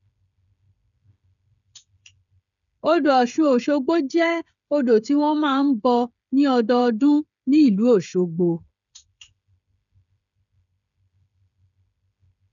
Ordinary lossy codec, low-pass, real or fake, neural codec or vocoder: none; 7.2 kHz; fake; codec, 16 kHz, 8 kbps, FreqCodec, smaller model